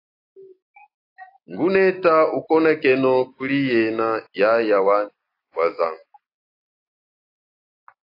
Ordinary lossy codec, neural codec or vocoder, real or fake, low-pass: AAC, 32 kbps; none; real; 5.4 kHz